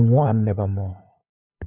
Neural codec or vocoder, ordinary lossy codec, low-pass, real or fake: codec, 16 kHz, 8 kbps, FunCodec, trained on LibriTTS, 25 frames a second; Opus, 64 kbps; 3.6 kHz; fake